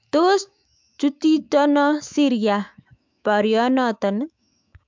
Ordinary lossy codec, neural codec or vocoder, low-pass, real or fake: MP3, 64 kbps; autoencoder, 48 kHz, 128 numbers a frame, DAC-VAE, trained on Japanese speech; 7.2 kHz; fake